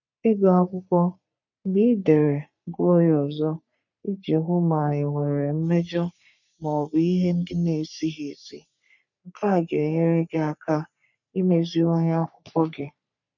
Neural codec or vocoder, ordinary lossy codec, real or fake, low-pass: codec, 44.1 kHz, 3.4 kbps, Pupu-Codec; none; fake; 7.2 kHz